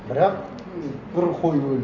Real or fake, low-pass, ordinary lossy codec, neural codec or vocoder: real; 7.2 kHz; none; none